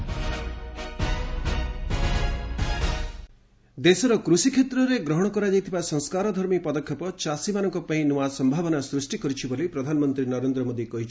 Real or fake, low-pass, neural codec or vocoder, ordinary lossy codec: real; none; none; none